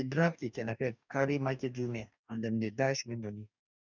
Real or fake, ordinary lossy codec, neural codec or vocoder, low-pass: fake; none; codec, 44.1 kHz, 2.6 kbps, DAC; 7.2 kHz